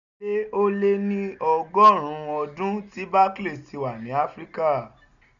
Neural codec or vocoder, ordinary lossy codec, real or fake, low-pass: none; none; real; 7.2 kHz